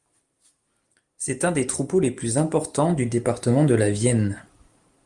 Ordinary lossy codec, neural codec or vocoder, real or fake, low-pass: Opus, 32 kbps; none; real; 10.8 kHz